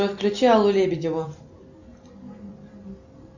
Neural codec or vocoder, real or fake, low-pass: none; real; 7.2 kHz